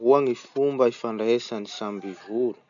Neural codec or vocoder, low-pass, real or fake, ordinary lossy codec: none; 7.2 kHz; real; none